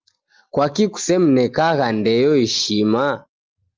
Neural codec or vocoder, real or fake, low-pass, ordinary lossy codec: none; real; 7.2 kHz; Opus, 32 kbps